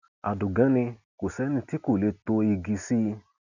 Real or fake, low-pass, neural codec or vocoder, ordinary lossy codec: real; 7.2 kHz; none; none